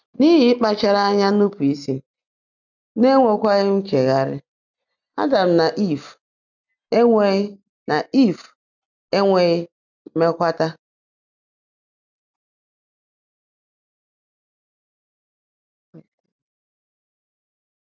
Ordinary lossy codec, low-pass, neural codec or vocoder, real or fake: none; 7.2 kHz; none; real